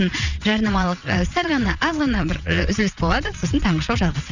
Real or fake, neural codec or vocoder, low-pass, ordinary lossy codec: fake; vocoder, 22.05 kHz, 80 mel bands, WaveNeXt; 7.2 kHz; none